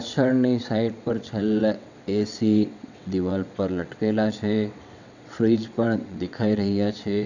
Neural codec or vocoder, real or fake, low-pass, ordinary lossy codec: vocoder, 22.05 kHz, 80 mel bands, WaveNeXt; fake; 7.2 kHz; none